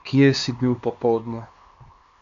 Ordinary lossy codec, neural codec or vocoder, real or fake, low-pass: MP3, 48 kbps; codec, 16 kHz, 2 kbps, X-Codec, HuBERT features, trained on LibriSpeech; fake; 7.2 kHz